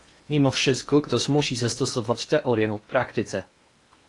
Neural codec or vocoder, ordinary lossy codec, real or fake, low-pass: codec, 16 kHz in and 24 kHz out, 0.8 kbps, FocalCodec, streaming, 65536 codes; AAC, 48 kbps; fake; 10.8 kHz